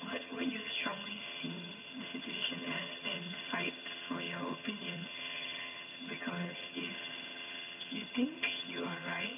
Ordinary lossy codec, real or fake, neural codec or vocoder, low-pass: none; fake; vocoder, 22.05 kHz, 80 mel bands, HiFi-GAN; 3.6 kHz